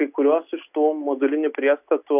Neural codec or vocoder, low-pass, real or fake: none; 3.6 kHz; real